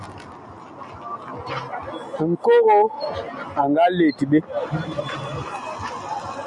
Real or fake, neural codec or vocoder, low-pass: real; none; 10.8 kHz